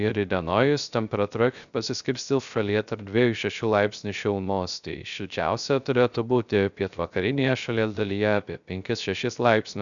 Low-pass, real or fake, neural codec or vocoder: 7.2 kHz; fake; codec, 16 kHz, 0.3 kbps, FocalCodec